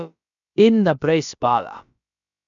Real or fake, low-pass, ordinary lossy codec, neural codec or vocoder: fake; 7.2 kHz; none; codec, 16 kHz, about 1 kbps, DyCAST, with the encoder's durations